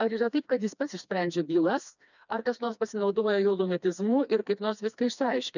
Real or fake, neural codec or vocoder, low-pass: fake; codec, 16 kHz, 2 kbps, FreqCodec, smaller model; 7.2 kHz